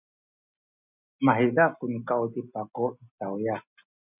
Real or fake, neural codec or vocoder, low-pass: real; none; 3.6 kHz